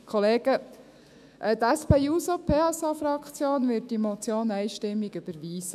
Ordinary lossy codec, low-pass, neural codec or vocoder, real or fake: none; 14.4 kHz; autoencoder, 48 kHz, 128 numbers a frame, DAC-VAE, trained on Japanese speech; fake